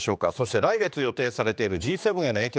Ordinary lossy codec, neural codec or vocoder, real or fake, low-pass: none; codec, 16 kHz, 2 kbps, X-Codec, HuBERT features, trained on general audio; fake; none